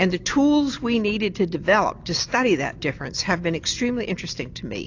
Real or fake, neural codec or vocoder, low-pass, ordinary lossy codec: real; none; 7.2 kHz; AAC, 48 kbps